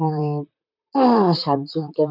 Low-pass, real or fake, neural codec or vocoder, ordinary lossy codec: 5.4 kHz; fake; vocoder, 44.1 kHz, 128 mel bands, Pupu-Vocoder; none